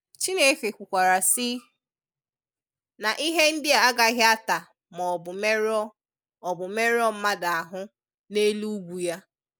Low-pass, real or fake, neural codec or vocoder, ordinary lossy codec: none; real; none; none